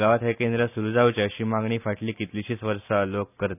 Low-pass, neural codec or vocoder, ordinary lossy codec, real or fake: 3.6 kHz; none; none; real